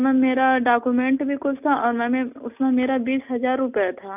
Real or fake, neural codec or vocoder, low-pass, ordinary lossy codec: real; none; 3.6 kHz; none